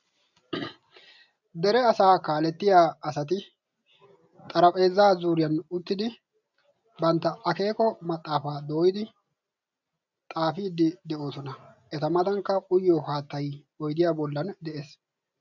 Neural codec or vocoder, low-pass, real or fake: none; 7.2 kHz; real